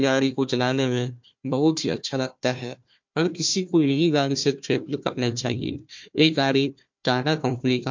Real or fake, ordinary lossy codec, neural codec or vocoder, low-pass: fake; MP3, 48 kbps; codec, 16 kHz, 1 kbps, FunCodec, trained on Chinese and English, 50 frames a second; 7.2 kHz